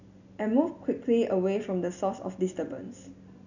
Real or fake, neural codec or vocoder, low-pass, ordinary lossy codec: real; none; 7.2 kHz; none